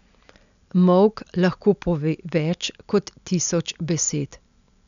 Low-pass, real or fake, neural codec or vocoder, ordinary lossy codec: 7.2 kHz; real; none; none